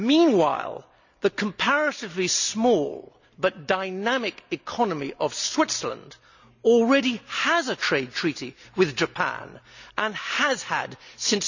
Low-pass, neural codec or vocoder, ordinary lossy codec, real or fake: 7.2 kHz; none; none; real